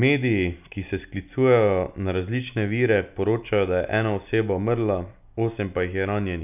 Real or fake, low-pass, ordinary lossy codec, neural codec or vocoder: real; 3.6 kHz; none; none